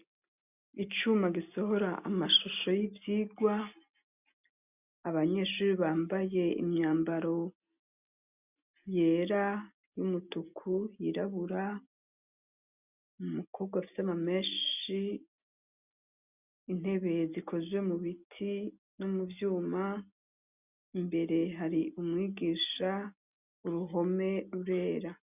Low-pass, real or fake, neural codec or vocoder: 3.6 kHz; real; none